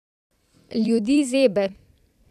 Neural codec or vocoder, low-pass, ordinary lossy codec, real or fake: vocoder, 44.1 kHz, 128 mel bands every 256 samples, BigVGAN v2; 14.4 kHz; none; fake